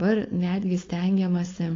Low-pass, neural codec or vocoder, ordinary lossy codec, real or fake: 7.2 kHz; codec, 16 kHz, 4.8 kbps, FACodec; AAC, 32 kbps; fake